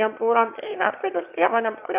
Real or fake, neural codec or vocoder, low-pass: fake; autoencoder, 22.05 kHz, a latent of 192 numbers a frame, VITS, trained on one speaker; 3.6 kHz